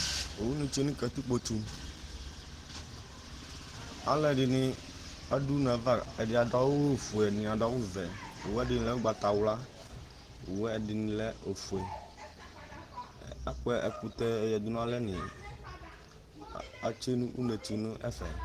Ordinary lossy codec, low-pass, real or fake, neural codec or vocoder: Opus, 16 kbps; 14.4 kHz; real; none